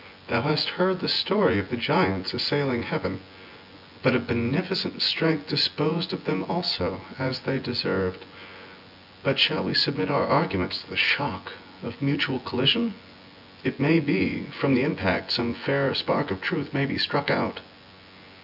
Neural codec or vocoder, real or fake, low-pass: vocoder, 24 kHz, 100 mel bands, Vocos; fake; 5.4 kHz